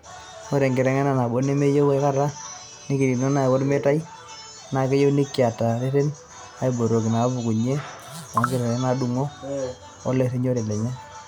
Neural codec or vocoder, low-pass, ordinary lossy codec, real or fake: none; none; none; real